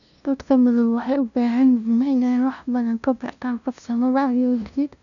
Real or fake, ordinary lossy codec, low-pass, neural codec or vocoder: fake; none; 7.2 kHz; codec, 16 kHz, 0.5 kbps, FunCodec, trained on LibriTTS, 25 frames a second